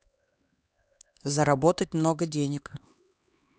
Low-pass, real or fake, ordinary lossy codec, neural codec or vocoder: none; fake; none; codec, 16 kHz, 2 kbps, X-Codec, HuBERT features, trained on LibriSpeech